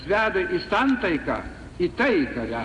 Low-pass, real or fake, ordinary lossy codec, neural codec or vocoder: 10.8 kHz; real; AAC, 48 kbps; none